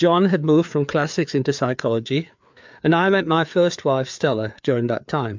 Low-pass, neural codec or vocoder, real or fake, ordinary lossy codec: 7.2 kHz; codec, 16 kHz, 4 kbps, FreqCodec, larger model; fake; MP3, 64 kbps